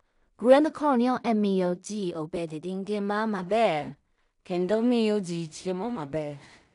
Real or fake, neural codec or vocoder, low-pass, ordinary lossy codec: fake; codec, 16 kHz in and 24 kHz out, 0.4 kbps, LongCat-Audio-Codec, two codebook decoder; 10.8 kHz; none